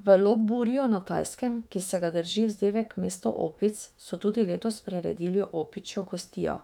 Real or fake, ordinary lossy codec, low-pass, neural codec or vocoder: fake; none; 19.8 kHz; autoencoder, 48 kHz, 32 numbers a frame, DAC-VAE, trained on Japanese speech